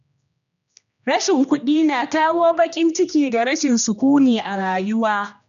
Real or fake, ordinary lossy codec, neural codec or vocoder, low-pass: fake; none; codec, 16 kHz, 1 kbps, X-Codec, HuBERT features, trained on general audio; 7.2 kHz